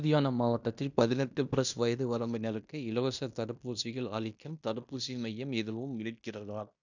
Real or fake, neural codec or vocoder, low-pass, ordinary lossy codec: fake; codec, 16 kHz in and 24 kHz out, 0.9 kbps, LongCat-Audio-Codec, fine tuned four codebook decoder; 7.2 kHz; none